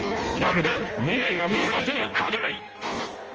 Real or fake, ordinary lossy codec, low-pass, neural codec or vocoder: fake; Opus, 24 kbps; 7.2 kHz; codec, 16 kHz in and 24 kHz out, 0.6 kbps, FireRedTTS-2 codec